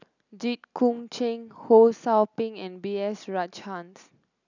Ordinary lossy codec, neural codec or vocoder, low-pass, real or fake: none; none; 7.2 kHz; real